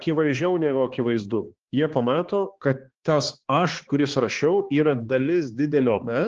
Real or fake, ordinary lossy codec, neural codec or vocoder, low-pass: fake; Opus, 16 kbps; codec, 16 kHz, 2 kbps, X-Codec, HuBERT features, trained on balanced general audio; 7.2 kHz